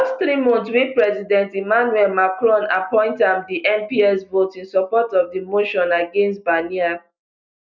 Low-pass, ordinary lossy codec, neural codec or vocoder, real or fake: 7.2 kHz; none; none; real